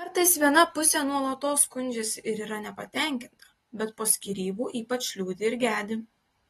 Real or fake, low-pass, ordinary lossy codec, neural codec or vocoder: real; 19.8 kHz; AAC, 32 kbps; none